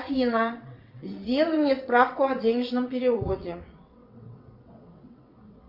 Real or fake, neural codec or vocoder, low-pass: fake; vocoder, 22.05 kHz, 80 mel bands, WaveNeXt; 5.4 kHz